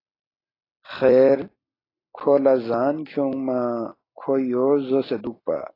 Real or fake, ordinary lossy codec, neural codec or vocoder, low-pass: fake; AAC, 24 kbps; vocoder, 44.1 kHz, 128 mel bands every 256 samples, BigVGAN v2; 5.4 kHz